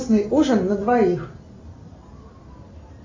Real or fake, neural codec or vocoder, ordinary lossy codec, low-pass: real; none; AAC, 32 kbps; 7.2 kHz